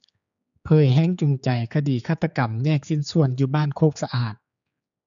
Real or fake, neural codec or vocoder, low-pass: fake; codec, 16 kHz, 4 kbps, X-Codec, HuBERT features, trained on general audio; 7.2 kHz